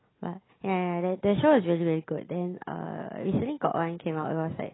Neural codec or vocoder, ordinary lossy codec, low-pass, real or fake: none; AAC, 16 kbps; 7.2 kHz; real